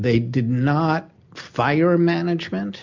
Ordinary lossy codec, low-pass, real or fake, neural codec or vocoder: MP3, 64 kbps; 7.2 kHz; real; none